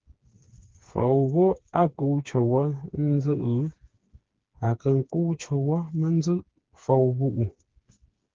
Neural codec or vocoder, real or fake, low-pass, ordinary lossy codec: codec, 16 kHz, 4 kbps, FreqCodec, smaller model; fake; 7.2 kHz; Opus, 16 kbps